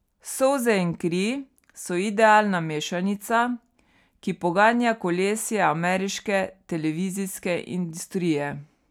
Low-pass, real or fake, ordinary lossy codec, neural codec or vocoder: 19.8 kHz; real; none; none